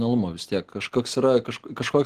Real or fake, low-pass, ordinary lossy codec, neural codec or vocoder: real; 14.4 kHz; Opus, 16 kbps; none